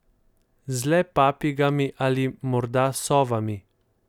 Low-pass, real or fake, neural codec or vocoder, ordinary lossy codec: 19.8 kHz; real; none; none